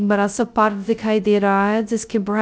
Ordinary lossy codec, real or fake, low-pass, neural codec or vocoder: none; fake; none; codec, 16 kHz, 0.2 kbps, FocalCodec